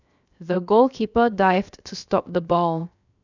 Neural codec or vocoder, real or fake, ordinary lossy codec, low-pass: codec, 16 kHz, 0.7 kbps, FocalCodec; fake; none; 7.2 kHz